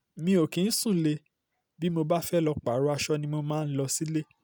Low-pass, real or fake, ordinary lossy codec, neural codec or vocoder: none; real; none; none